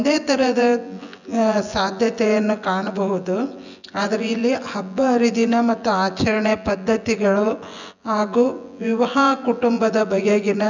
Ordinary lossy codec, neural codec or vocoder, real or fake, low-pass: none; vocoder, 24 kHz, 100 mel bands, Vocos; fake; 7.2 kHz